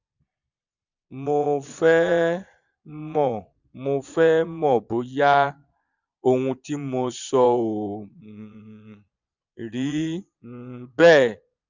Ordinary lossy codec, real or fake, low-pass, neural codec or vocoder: none; fake; 7.2 kHz; vocoder, 22.05 kHz, 80 mel bands, Vocos